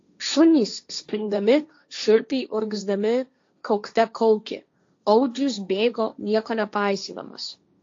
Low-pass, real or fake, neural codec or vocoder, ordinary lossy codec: 7.2 kHz; fake; codec, 16 kHz, 1.1 kbps, Voila-Tokenizer; AAC, 48 kbps